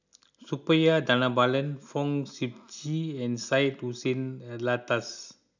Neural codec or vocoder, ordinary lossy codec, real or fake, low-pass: none; none; real; 7.2 kHz